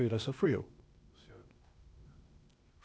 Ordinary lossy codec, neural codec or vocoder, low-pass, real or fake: none; codec, 16 kHz, 0.8 kbps, ZipCodec; none; fake